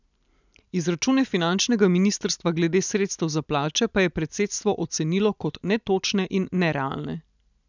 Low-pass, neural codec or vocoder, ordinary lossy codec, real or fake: 7.2 kHz; none; none; real